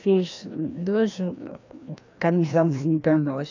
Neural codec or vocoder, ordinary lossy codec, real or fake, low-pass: codec, 16 kHz, 1 kbps, FreqCodec, larger model; AAC, 48 kbps; fake; 7.2 kHz